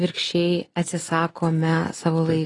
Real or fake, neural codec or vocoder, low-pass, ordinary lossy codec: real; none; 10.8 kHz; AAC, 32 kbps